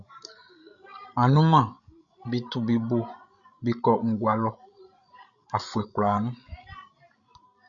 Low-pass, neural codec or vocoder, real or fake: 7.2 kHz; codec, 16 kHz, 16 kbps, FreqCodec, larger model; fake